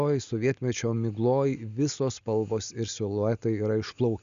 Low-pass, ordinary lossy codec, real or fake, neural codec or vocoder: 7.2 kHz; Opus, 64 kbps; real; none